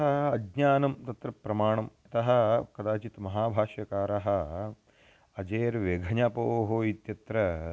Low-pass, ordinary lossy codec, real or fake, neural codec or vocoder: none; none; real; none